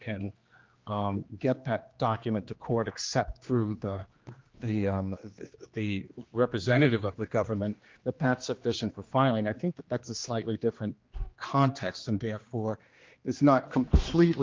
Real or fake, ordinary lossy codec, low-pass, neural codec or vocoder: fake; Opus, 32 kbps; 7.2 kHz; codec, 16 kHz, 2 kbps, X-Codec, HuBERT features, trained on general audio